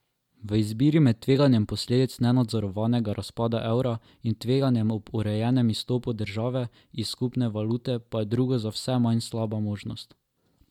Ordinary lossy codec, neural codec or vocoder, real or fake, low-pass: MP3, 96 kbps; none; real; 19.8 kHz